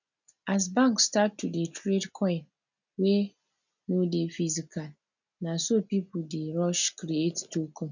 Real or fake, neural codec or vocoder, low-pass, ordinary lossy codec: real; none; 7.2 kHz; none